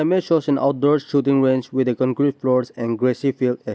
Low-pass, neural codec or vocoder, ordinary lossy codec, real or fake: none; none; none; real